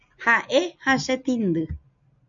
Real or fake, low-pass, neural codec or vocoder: real; 7.2 kHz; none